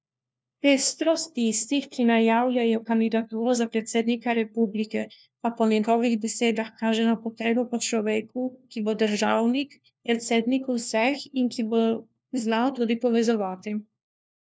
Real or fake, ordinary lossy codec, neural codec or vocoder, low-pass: fake; none; codec, 16 kHz, 1 kbps, FunCodec, trained on LibriTTS, 50 frames a second; none